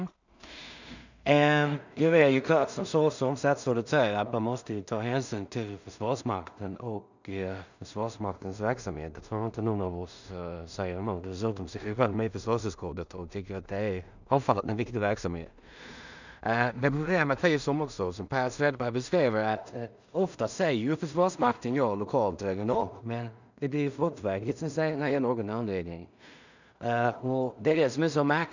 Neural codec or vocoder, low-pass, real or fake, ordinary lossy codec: codec, 16 kHz in and 24 kHz out, 0.4 kbps, LongCat-Audio-Codec, two codebook decoder; 7.2 kHz; fake; none